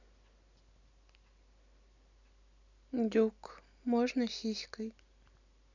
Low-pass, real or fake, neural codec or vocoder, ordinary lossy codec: 7.2 kHz; real; none; none